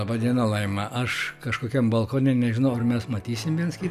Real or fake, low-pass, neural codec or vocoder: real; 14.4 kHz; none